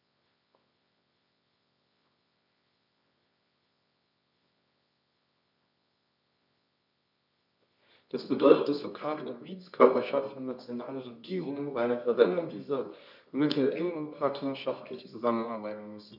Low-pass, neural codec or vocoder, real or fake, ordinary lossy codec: 5.4 kHz; codec, 24 kHz, 0.9 kbps, WavTokenizer, medium music audio release; fake; none